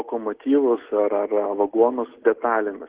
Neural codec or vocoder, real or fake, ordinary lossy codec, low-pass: none; real; Opus, 16 kbps; 3.6 kHz